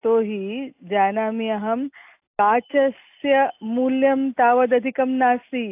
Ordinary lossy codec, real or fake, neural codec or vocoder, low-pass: AAC, 32 kbps; real; none; 3.6 kHz